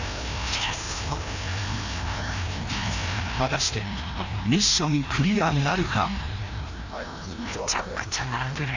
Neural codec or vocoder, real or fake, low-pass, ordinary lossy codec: codec, 16 kHz, 1 kbps, FreqCodec, larger model; fake; 7.2 kHz; AAC, 48 kbps